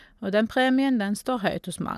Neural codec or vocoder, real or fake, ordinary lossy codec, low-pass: none; real; none; 14.4 kHz